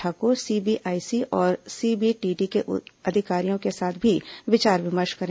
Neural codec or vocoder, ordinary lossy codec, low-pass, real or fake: none; none; none; real